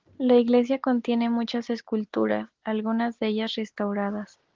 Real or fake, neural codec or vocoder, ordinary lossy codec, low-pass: real; none; Opus, 16 kbps; 7.2 kHz